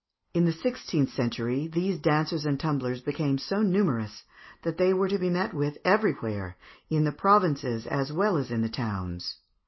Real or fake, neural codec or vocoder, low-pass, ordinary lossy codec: real; none; 7.2 kHz; MP3, 24 kbps